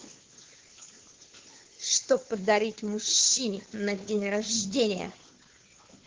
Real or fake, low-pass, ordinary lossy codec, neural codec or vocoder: fake; 7.2 kHz; Opus, 16 kbps; codec, 16 kHz, 2 kbps, FunCodec, trained on Chinese and English, 25 frames a second